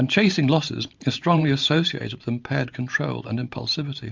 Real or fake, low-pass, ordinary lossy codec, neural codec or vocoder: fake; 7.2 kHz; MP3, 64 kbps; vocoder, 44.1 kHz, 128 mel bands every 512 samples, BigVGAN v2